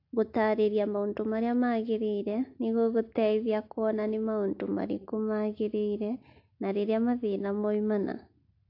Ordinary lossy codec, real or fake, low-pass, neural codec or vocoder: AAC, 32 kbps; real; 5.4 kHz; none